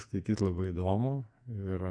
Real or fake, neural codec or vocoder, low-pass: fake; codec, 44.1 kHz, 2.6 kbps, SNAC; 9.9 kHz